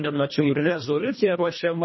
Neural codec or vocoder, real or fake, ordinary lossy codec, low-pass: codec, 24 kHz, 1.5 kbps, HILCodec; fake; MP3, 24 kbps; 7.2 kHz